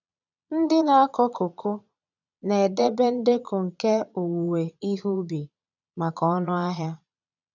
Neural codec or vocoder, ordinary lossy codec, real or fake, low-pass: vocoder, 22.05 kHz, 80 mel bands, Vocos; none; fake; 7.2 kHz